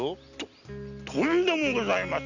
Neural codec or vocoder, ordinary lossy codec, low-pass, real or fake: none; none; 7.2 kHz; real